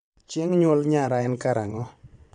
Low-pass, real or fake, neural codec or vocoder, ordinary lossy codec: 9.9 kHz; fake; vocoder, 22.05 kHz, 80 mel bands, WaveNeXt; MP3, 96 kbps